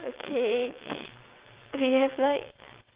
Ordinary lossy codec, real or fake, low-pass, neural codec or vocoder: Opus, 32 kbps; fake; 3.6 kHz; vocoder, 22.05 kHz, 80 mel bands, WaveNeXt